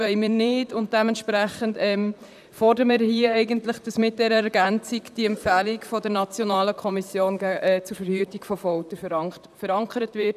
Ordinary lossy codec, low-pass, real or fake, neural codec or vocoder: none; 14.4 kHz; fake; vocoder, 44.1 kHz, 128 mel bands, Pupu-Vocoder